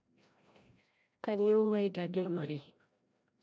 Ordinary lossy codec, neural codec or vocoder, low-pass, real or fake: none; codec, 16 kHz, 0.5 kbps, FreqCodec, larger model; none; fake